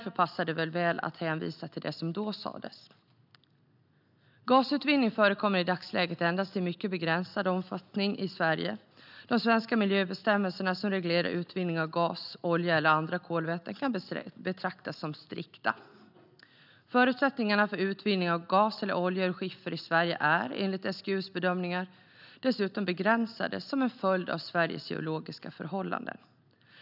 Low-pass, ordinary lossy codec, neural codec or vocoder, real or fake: 5.4 kHz; none; none; real